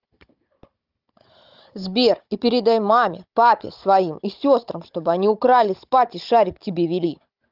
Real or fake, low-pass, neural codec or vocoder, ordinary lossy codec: fake; 5.4 kHz; codec, 16 kHz, 16 kbps, FunCodec, trained on Chinese and English, 50 frames a second; Opus, 24 kbps